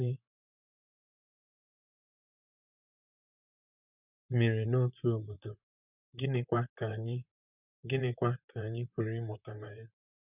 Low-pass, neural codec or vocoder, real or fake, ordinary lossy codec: 3.6 kHz; vocoder, 44.1 kHz, 128 mel bands, Pupu-Vocoder; fake; none